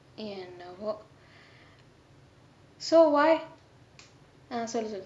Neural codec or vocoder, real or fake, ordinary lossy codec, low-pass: none; real; none; none